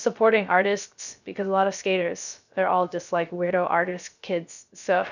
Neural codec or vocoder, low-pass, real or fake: codec, 16 kHz, 0.3 kbps, FocalCodec; 7.2 kHz; fake